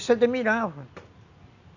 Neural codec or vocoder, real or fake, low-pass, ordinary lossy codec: none; real; 7.2 kHz; AAC, 48 kbps